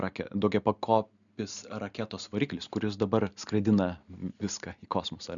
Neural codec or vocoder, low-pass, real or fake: none; 7.2 kHz; real